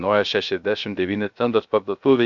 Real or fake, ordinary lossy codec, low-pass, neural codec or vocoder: fake; MP3, 96 kbps; 7.2 kHz; codec, 16 kHz, 0.3 kbps, FocalCodec